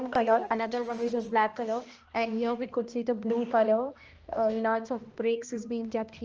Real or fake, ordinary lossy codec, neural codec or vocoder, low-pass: fake; Opus, 24 kbps; codec, 16 kHz, 1 kbps, X-Codec, HuBERT features, trained on balanced general audio; 7.2 kHz